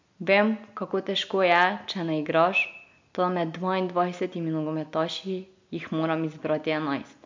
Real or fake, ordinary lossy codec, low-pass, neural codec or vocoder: real; MP3, 48 kbps; 7.2 kHz; none